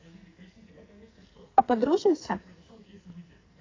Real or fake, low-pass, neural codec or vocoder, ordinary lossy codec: fake; 7.2 kHz; codec, 44.1 kHz, 2.6 kbps, SNAC; AAC, 32 kbps